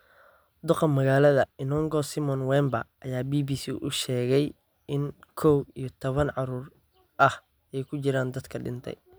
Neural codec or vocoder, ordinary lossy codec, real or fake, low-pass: none; none; real; none